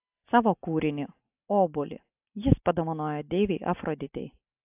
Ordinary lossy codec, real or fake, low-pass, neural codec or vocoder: AAC, 32 kbps; real; 3.6 kHz; none